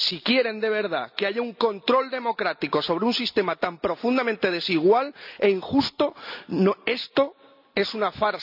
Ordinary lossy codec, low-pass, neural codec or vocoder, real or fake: none; 5.4 kHz; none; real